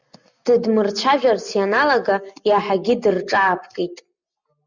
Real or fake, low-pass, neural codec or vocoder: real; 7.2 kHz; none